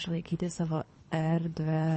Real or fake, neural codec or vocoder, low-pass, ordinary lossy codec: fake; codec, 24 kHz, 3 kbps, HILCodec; 10.8 kHz; MP3, 32 kbps